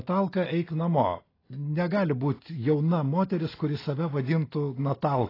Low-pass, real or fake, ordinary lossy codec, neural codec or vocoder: 5.4 kHz; real; AAC, 24 kbps; none